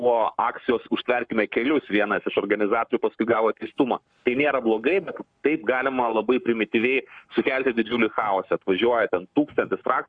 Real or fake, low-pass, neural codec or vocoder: fake; 9.9 kHz; codec, 44.1 kHz, 7.8 kbps, Pupu-Codec